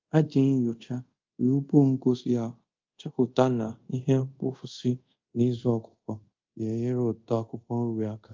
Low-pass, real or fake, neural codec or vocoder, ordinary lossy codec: 7.2 kHz; fake; codec, 24 kHz, 0.5 kbps, DualCodec; Opus, 24 kbps